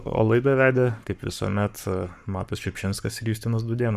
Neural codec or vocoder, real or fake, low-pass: codec, 44.1 kHz, 7.8 kbps, Pupu-Codec; fake; 14.4 kHz